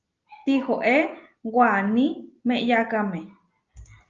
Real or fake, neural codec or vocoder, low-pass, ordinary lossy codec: real; none; 7.2 kHz; Opus, 32 kbps